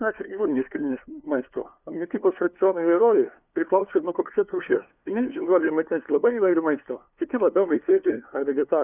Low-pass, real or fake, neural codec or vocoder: 3.6 kHz; fake; codec, 16 kHz, 2 kbps, FunCodec, trained on LibriTTS, 25 frames a second